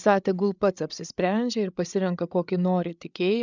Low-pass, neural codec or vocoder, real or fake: 7.2 kHz; codec, 16 kHz, 8 kbps, FreqCodec, larger model; fake